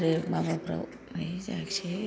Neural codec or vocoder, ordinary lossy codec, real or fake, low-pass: none; none; real; none